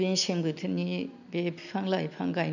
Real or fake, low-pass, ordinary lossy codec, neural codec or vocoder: real; 7.2 kHz; none; none